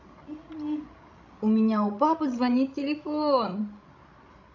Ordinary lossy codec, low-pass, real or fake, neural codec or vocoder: none; 7.2 kHz; fake; codec, 16 kHz, 16 kbps, FreqCodec, larger model